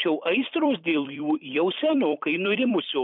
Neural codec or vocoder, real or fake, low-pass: vocoder, 44.1 kHz, 128 mel bands every 256 samples, BigVGAN v2; fake; 5.4 kHz